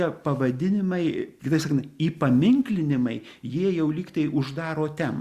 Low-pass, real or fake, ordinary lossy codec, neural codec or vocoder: 14.4 kHz; real; Opus, 64 kbps; none